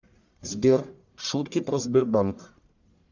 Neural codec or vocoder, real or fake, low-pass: codec, 44.1 kHz, 1.7 kbps, Pupu-Codec; fake; 7.2 kHz